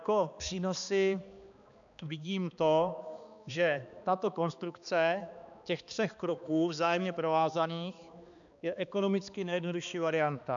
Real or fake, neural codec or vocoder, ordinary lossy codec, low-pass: fake; codec, 16 kHz, 2 kbps, X-Codec, HuBERT features, trained on balanced general audio; MP3, 96 kbps; 7.2 kHz